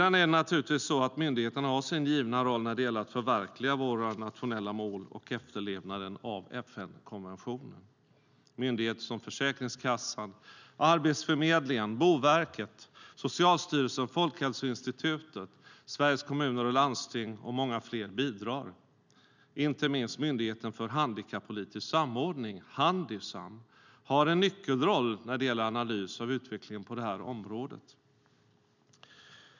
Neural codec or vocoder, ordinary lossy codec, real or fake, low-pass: none; none; real; 7.2 kHz